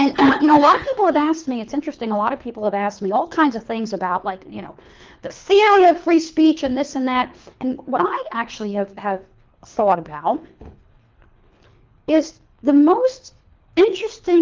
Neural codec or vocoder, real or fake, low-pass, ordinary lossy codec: codec, 24 kHz, 3 kbps, HILCodec; fake; 7.2 kHz; Opus, 24 kbps